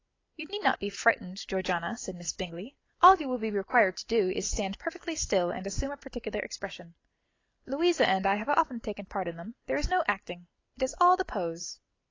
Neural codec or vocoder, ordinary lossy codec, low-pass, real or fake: none; AAC, 32 kbps; 7.2 kHz; real